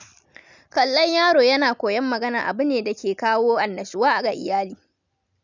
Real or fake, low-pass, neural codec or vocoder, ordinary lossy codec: real; 7.2 kHz; none; none